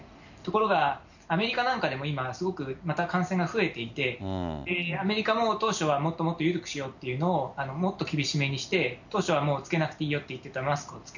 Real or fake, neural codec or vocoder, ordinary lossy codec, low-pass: real; none; none; 7.2 kHz